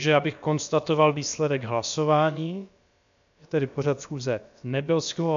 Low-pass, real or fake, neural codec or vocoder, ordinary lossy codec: 7.2 kHz; fake; codec, 16 kHz, about 1 kbps, DyCAST, with the encoder's durations; MP3, 64 kbps